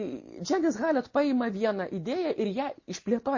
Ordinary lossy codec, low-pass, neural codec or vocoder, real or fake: MP3, 32 kbps; 7.2 kHz; none; real